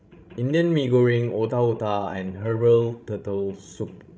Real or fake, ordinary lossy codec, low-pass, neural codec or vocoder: fake; none; none; codec, 16 kHz, 16 kbps, FreqCodec, larger model